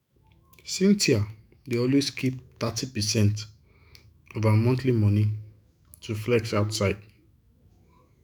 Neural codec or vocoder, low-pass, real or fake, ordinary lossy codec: autoencoder, 48 kHz, 128 numbers a frame, DAC-VAE, trained on Japanese speech; none; fake; none